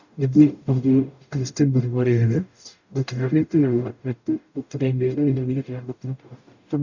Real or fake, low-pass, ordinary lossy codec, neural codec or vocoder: fake; 7.2 kHz; none; codec, 44.1 kHz, 0.9 kbps, DAC